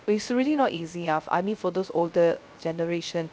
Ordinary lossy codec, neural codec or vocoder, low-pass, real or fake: none; codec, 16 kHz, 0.3 kbps, FocalCodec; none; fake